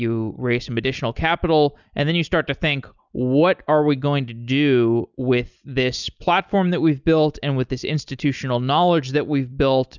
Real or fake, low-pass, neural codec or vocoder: real; 7.2 kHz; none